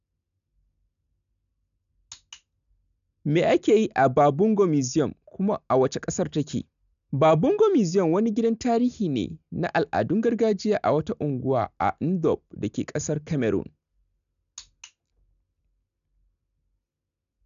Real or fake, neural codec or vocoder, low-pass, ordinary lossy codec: real; none; 7.2 kHz; none